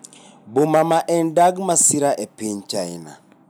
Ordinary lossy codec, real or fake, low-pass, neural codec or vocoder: none; real; none; none